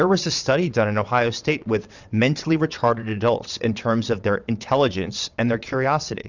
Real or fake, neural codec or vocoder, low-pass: fake; vocoder, 22.05 kHz, 80 mel bands, WaveNeXt; 7.2 kHz